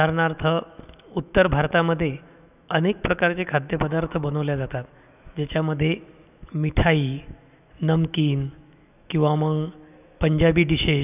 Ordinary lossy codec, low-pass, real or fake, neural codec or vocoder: none; 3.6 kHz; fake; autoencoder, 48 kHz, 128 numbers a frame, DAC-VAE, trained on Japanese speech